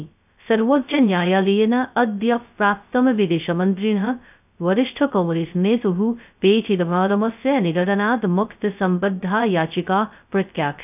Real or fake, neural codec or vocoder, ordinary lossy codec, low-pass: fake; codec, 16 kHz, 0.2 kbps, FocalCodec; none; 3.6 kHz